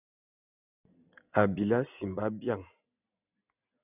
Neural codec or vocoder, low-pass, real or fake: none; 3.6 kHz; real